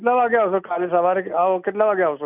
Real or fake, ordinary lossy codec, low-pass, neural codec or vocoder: real; none; 3.6 kHz; none